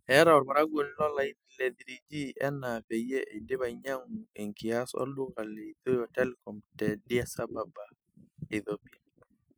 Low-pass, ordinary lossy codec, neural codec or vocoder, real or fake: none; none; none; real